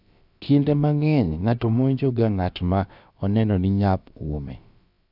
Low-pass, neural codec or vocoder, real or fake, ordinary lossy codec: 5.4 kHz; codec, 16 kHz, about 1 kbps, DyCAST, with the encoder's durations; fake; none